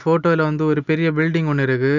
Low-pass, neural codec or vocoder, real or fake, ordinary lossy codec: 7.2 kHz; none; real; none